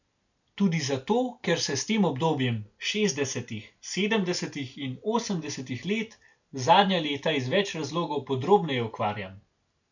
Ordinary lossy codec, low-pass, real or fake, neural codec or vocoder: none; 7.2 kHz; real; none